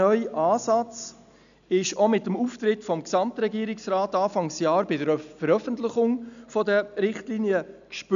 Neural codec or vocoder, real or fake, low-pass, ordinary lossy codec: none; real; 7.2 kHz; none